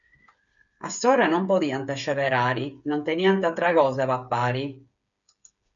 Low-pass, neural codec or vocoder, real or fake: 7.2 kHz; codec, 16 kHz, 8 kbps, FreqCodec, smaller model; fake